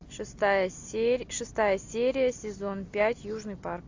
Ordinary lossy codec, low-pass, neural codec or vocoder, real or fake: AAC, 48 kbps; 7.2 kHz; none; real